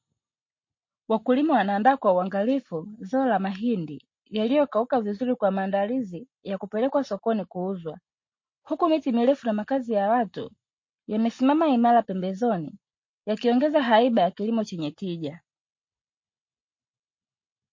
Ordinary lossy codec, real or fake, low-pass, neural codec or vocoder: MP3, 32 kbps; real; 7.2 kHz; none